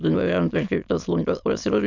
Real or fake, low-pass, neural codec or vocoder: fake; 7.2 kHz; autoencoder, 22.05 kHz, a latent of 192 numbers a frame, VITS, trained on many speakers